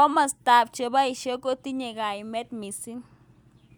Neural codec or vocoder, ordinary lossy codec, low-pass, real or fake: none; none; none; real